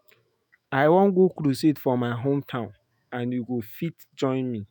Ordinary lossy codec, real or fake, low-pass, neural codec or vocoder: none; fake; none; autoencoder, 48 kHz, 128 numbers a frame, DAC-VAE, trained on Japanese speech